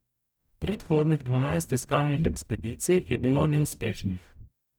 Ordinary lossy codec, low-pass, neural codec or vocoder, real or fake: none; none; codec, 44.1 kHz, 0.9 kbps, DAC; fake